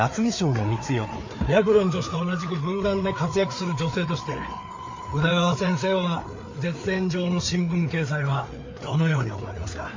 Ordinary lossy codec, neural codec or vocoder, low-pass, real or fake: MP3, 48 kbps; codec, 16 kHz, 4 kbps, FreqCodec, larger model; 7.2 kHz; fake